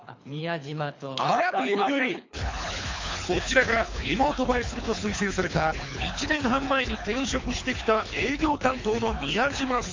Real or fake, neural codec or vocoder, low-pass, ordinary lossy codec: fake; codec, 24 kHz, 3 kbps, HILCodec; 7.2 kHz; MP3, 48 kbps